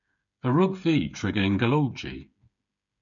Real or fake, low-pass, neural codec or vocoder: fake; 7.2 kHz; codec, 16 kHz, 8 kbps, FreqCodec, smaller model